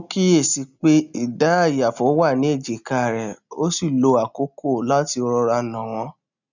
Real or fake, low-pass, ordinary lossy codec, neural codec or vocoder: real; 7.2 kHz; none; none